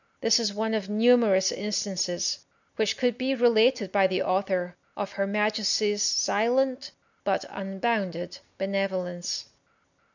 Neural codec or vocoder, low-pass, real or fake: none; 7.2 kHz; real